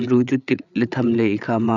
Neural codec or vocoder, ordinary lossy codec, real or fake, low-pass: codec, 16 kHz, 8 kbps, FreqCodec, larger model; none; fake; 7.2 kHz